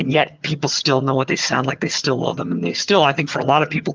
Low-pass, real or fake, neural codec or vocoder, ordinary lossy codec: 7.2 kHz; fake; vocoder, 22.05 kHz, 80 mel bands, HiFi-GAN; Opus, 32 kbps